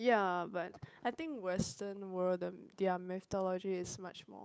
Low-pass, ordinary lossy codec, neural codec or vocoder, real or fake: none; none; codec, 16 kHz, 8 kbps, FunCodec, trained on Chinese and English, 25 frames a second; fake